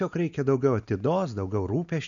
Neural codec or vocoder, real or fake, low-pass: none; real; 7.2 kHz